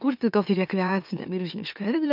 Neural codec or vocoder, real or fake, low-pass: autoencoder, 44.1 kHz, a latent of 192 numbers a frame, MeloTTS; fake; 5.4 kHz